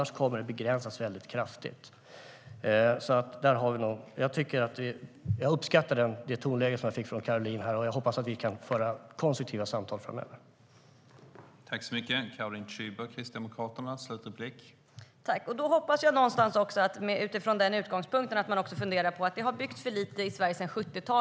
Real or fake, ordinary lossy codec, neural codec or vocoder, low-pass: real; none; none; none